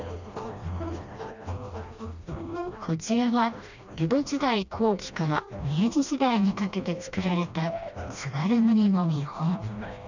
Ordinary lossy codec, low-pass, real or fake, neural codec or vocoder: none; 7.2 kHz; fake; codec, 16 kHz, 1 kbps, FreqCodec, smaller model